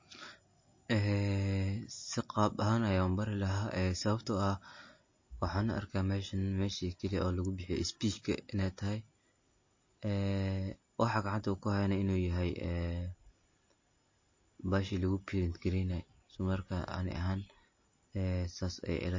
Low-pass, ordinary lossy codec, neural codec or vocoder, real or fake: 7.2 kHz; MP3, 32 kbps; none; real